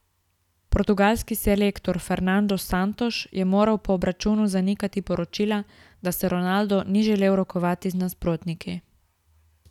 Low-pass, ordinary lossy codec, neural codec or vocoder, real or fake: 19.8 kHz; none; none; real